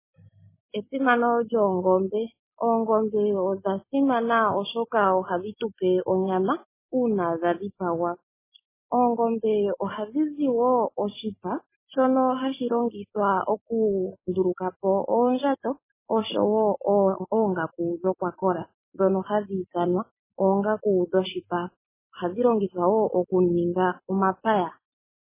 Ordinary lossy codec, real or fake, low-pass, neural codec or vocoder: MP3, 16 kbps; real; 3.6 kHz; none